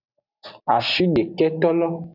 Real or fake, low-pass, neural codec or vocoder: real; 5.4 kHz; none